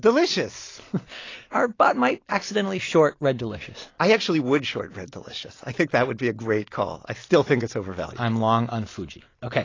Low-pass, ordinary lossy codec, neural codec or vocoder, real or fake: 7.2 kHz; AAC, 32 kbps; none; real